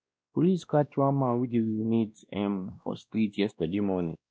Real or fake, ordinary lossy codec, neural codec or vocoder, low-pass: fake; none; codec, 16 kHz, 1 kbps, X-Codec, WavLM features, trained on Multilingual LibriSpeech; none